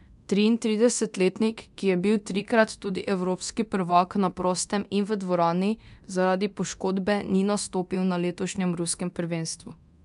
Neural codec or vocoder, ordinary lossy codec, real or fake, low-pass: codec, 24 kHz, 0.9 kbps, DualCodec; none; fake; 10.8 kHz